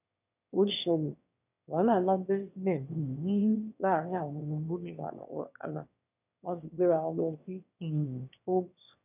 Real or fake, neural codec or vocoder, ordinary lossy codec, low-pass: fake; autoencoder, 22.05 kHz, a latent of 192 numbers a frame, VITS, trained on one speaker; none; 3.6 kHz